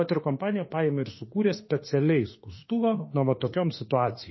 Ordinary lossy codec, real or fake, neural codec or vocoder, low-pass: MP3, 24 kbps; fake; autoencoder, 48 kHz, 32 numbers a frame, DAC-VAE, trained on Japanese speech; 7.2 kHz